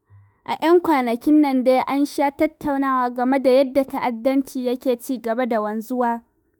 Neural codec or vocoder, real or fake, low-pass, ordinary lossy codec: autoencoder, 48 kHz, 32 numbers a frame, DAC-VAE, trained on Japanese speech; fake; none; none